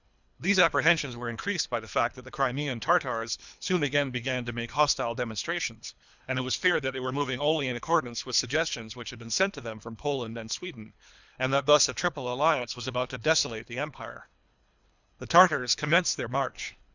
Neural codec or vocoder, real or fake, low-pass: codec, 24 kHz, 3 kbps, HILCodec; fake; 7.2 kHz